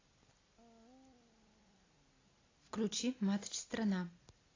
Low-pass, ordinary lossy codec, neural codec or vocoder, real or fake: 7.2 kHz; AAC, 32 kbps; none; real